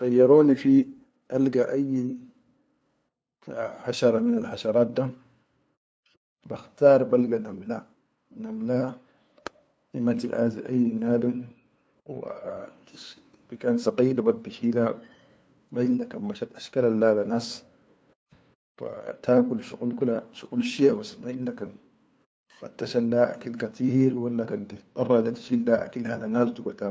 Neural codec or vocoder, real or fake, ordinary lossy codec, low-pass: codec, 16 kHz, 2 kbps, FunCodec, trained on LibriTTS, 25 frames a second; fake; none; none